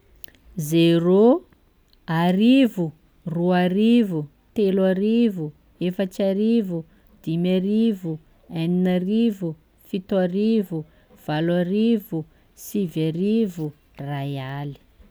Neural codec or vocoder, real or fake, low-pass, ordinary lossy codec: none; real; none; none